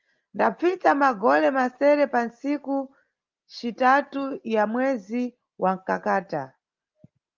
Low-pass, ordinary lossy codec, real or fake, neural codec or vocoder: 7.2 kHz; Opus, 32 kbps; real; none